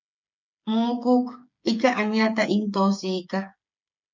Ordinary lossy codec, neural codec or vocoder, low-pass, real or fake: MP3, 64 kbps; codec, 16 kHz, 8 kbps, FreqCodec, smaller model; 7.2 kHz; fake